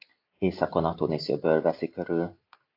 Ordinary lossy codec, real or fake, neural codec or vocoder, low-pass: AAC, 32 kbps; real; none; 5.4 kHz